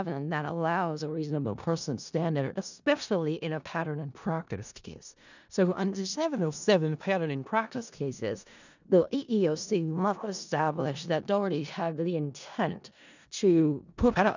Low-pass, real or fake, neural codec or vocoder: 7.2 kHz; fake; codec, 16 kHz in and 24 kHz out, 0.4 kbps, LongCat-Audio-Codec, four codebook decoder